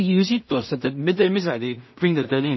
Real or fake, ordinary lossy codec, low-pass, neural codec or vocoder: fake; MP3, 24 kbps; 7.2 kHz; codec, 16 kHz in and 24 kHz out, 0.4 kbps, LongCat-Audio-Codec, two codebook decoder